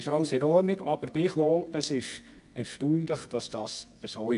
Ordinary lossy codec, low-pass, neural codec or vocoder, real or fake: AAC, 96 kbps; 10.8 kHz; codec, 24 kHz, 0.9 kbps, WavTokenizer, medium music audio release; fake